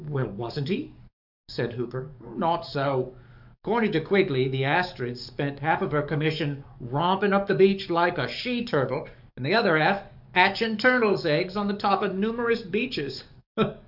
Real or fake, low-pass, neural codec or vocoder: fake; 5.4 kHz; codec, 44.1 kHz, 7.8 kbps, DAC